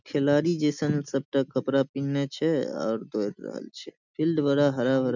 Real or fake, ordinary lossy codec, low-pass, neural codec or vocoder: real; none; 7.2 kHz; none